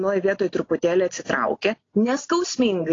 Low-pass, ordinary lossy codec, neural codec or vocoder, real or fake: 7.2 kHz; AAC, 32 kbps; none; real